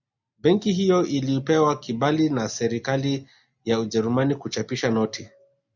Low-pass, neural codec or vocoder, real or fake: 7.2 kHz; none; real